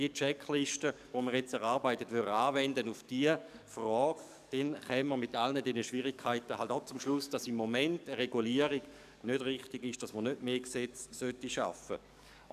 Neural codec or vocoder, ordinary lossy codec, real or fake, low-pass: codec, 44.1 kHz, 7.8 kbps, DAC; none; fake; 14.4 kHz